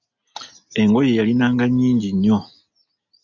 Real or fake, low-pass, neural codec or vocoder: real; 7.2 kHz; none